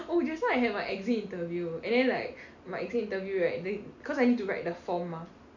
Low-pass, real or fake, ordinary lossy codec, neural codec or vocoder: 7.2 kHz; real; none; none